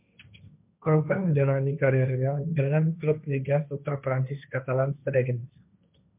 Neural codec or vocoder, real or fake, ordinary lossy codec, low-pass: codec, 16 kHz, 1.1 kbps, Voila-Tokenizer; fake; MP3, 32 kbps; 3.6 kHz